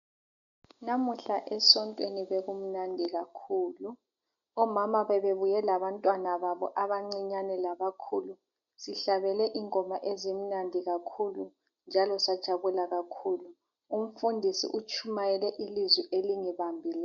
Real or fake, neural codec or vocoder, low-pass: real; none; 7.2 kHz